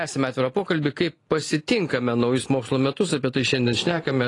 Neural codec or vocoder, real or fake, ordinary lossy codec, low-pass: none; real; AAC, 32 kbps; 10.8 kHz